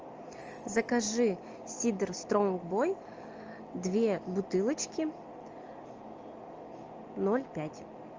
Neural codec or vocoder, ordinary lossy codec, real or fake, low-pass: none; Opus, 24 kbps; real; 7.2 kHz